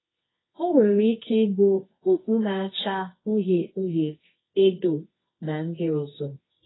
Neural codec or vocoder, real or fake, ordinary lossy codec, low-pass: codec, 24 kHz, 0.9 kbps, WavTokenizer, medium music audio release; fake; AAC, 16 kbps; 7.2 kHz